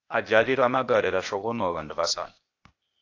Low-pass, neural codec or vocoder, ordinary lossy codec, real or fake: 7.2 kHz; codec, 16 kHz, 0.8 kbps, ZipCodec; AAC, 48 kbps; fake